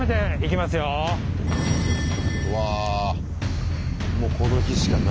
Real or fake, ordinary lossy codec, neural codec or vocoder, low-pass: real; none; none; none